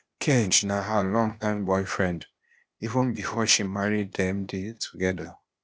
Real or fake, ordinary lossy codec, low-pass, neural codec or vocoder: fake; none; none; codec, 16 kHz, 0.8 kbps, ZipCodec